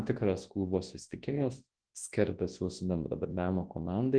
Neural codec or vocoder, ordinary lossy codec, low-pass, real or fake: codec, 24 kHz, 0.9 kbps, WavTokenizer, large speech release; Opus, 16 kbps; 9.9 kHz; fake